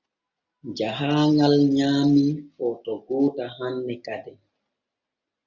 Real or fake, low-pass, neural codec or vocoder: real; 7.2 kHz; none